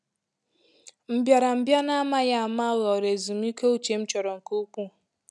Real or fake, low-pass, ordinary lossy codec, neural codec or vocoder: real; none; none; none